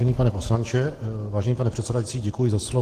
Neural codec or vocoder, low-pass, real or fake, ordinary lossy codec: autoencoder, 48 kHz, 128 numbers a frame, DAC-VAE, trained on Japanese speech; 14.4 kHz; fake; Opus, 16 kbps